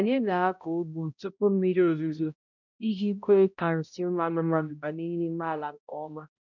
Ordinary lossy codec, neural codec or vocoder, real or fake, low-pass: none; codec, 16 kHz, 0.5 kbps, X-Codec, HuBERT features, trained on balanced general audio; fake; 7.2 kHz